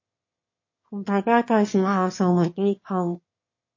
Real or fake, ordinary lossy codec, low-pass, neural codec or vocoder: fake; MP3, 32 kbps; 7.2 kHz; autoencoder, 22.05 kHz, a latent of 192 numbers a frame, VITS, trained on one speaker